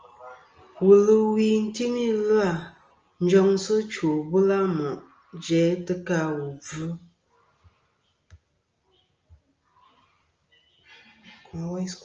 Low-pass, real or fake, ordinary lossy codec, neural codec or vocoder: 7.2 kHz; real; Opus, 24 kbps; none